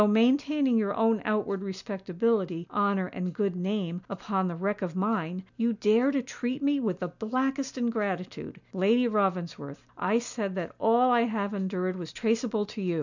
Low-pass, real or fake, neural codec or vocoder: 7.2 kHz; real; none